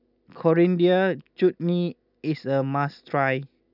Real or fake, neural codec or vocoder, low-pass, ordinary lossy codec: real; none; 5.4 kHz; none